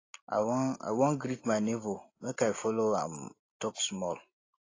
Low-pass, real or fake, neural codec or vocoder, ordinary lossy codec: 7.2 kHz; real; none; AAC, 32 kbps